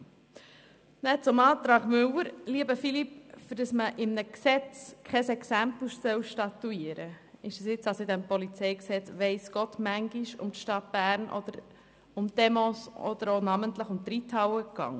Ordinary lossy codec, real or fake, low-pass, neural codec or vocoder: none; real; none; none